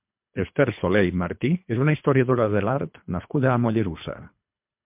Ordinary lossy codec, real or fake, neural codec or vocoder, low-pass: MP3, 32 kbps; fake; codec, 24 kHz, 3 kbps, HILCodec; 3.6 kHz